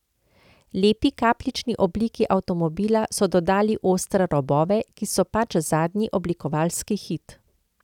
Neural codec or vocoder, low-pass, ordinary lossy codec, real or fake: none; 19.8 kHz; none; real